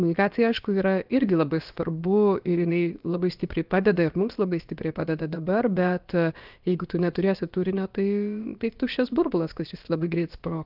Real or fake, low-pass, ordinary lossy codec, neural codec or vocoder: fake; 5.4 kHz; Opus, 32 kbps; codec, 16 kHz, about 1 kbps, DyCAST, with the encoder's durations